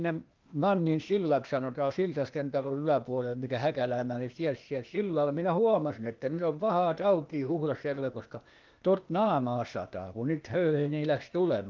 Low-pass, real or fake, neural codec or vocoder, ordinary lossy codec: 7.2 kHz; fake; codec, 16 kHz, 0.8 kbps, ZipCodec; Opus, 24 kbps